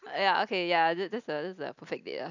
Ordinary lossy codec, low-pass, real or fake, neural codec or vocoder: none; 7.2 kHz; real; none